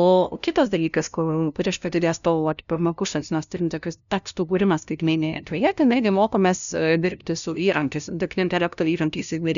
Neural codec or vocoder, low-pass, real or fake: codec, 16 kHz, 0.5 kbps, FunCodec, trained on LibriTTS, 25 frames a second; 7.2 kHz; fake